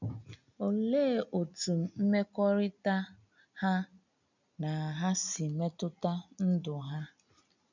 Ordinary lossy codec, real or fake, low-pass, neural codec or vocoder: none; real; 7.2 kHz; none